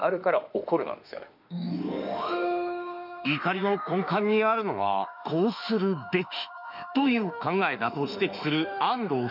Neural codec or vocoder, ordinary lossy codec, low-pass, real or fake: autoencoder, 48 kHz, 32 numbers a frame, DAC-VAE, trained on Japanese speech; none; 5.4 kHz; fake